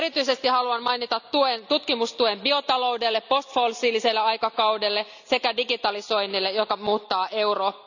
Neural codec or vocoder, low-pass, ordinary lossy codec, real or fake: none; 7.2 kHz; none; real